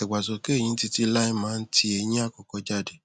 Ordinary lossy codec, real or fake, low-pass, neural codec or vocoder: none; real; none; none